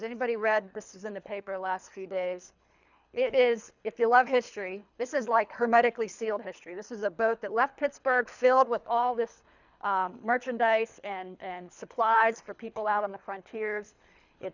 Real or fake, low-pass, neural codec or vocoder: fake; 7.2 kHz; codec, 24 kHz, 3 kbps, HILCodec